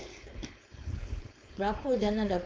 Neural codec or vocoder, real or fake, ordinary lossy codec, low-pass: codec, 16 kHz, 4.8 kbps, FACodec; fake; none; none